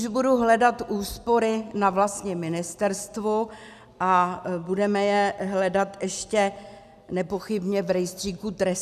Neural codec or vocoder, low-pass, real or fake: none; 14.4 kHz; real